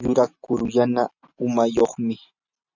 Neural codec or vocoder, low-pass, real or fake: none; 7.2 kHz; real